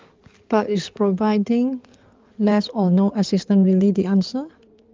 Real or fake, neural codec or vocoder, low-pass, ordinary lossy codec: fake; codec, 16 kHz in and 24 kHz out, 2.2 kbps, FireRedTTS-2 codec; 7.2 kHz; Opus, 32 kbps